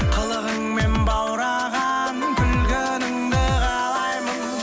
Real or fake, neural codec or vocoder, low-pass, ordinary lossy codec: real; none; none; none